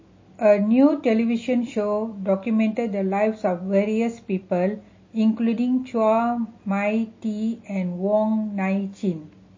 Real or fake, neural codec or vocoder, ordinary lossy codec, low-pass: real; none; MP3, 32 kbps; 7.2 kHz